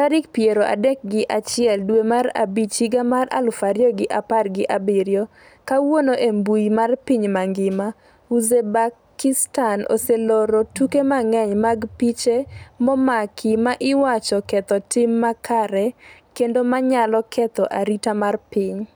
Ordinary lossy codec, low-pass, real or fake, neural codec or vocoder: none; none; real; none